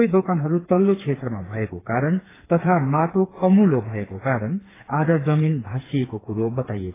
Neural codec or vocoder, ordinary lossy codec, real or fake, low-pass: codec, 16 kHz, 4 kbps, FreqCodec, smaller model; AAC, 16 kbps; fake; 3.6 kHz